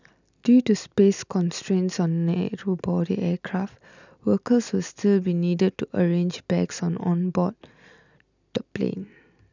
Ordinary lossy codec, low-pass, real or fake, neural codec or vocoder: none; 7.2 kHz; real; none